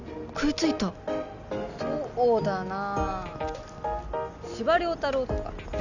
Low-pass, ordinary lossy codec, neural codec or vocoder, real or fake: 7.2 kHz; none; none; real